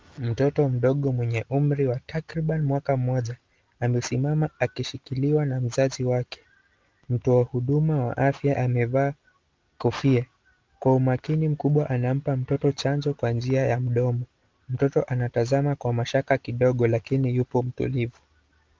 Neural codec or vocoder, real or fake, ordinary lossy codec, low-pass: none; real; Opus, 32 kbps; 7.2 kHz